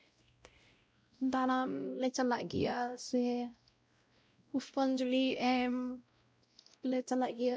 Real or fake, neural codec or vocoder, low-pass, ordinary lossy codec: fake; codec, 16 kHz, 0.5 kbps, X-Codec, WavLM features, trained on Multilingual LibriSpeech; none; none